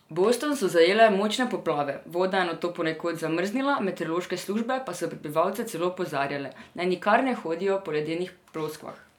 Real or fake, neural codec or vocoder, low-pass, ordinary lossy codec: real; none; 19.8 kHz; none